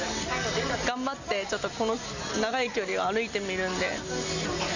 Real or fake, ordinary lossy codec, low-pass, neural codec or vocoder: real; none; 7.2 kHz; none